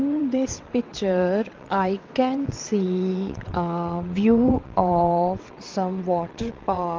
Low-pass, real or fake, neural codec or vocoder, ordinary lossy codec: 7.2 kHz; fake; vocoder, 22.05 kHz, 80 mel bands, WaveNeXt; Opus, 24 kbps